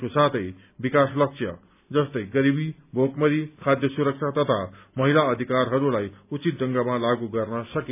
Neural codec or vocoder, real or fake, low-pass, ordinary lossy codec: none; real; 3.6 kHz; none